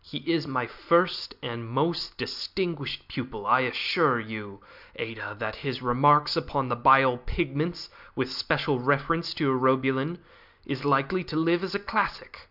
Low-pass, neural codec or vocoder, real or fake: 5.4 kHz; none; real